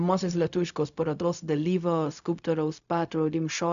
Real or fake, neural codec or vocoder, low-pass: fake; codec, 16 kHz, 0.4 kbps, LongCat-Audio-Codec; 7.2 kHz